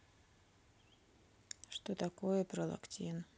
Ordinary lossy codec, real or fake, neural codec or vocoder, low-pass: none; real; none; none